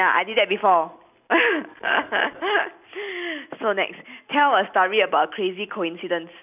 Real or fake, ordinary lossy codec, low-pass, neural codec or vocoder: real; none; 3.6 kHz; none